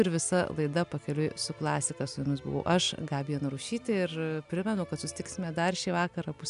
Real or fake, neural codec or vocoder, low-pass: real; none; 10.8 kHz